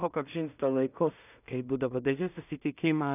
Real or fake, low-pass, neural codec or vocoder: fake; 3.6 kHz; codec, 16 kHz in and 24 kHz out, 0.4 kbps, LongCat-Audio-Codec, two codebook decoder